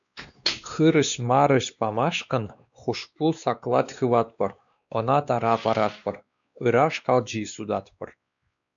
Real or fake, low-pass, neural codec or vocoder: fake; 7.2 kHz; codec, 16 kHz, 2 kbps, X-Codec, WavLM features, trained on Multilingual LibriSpeech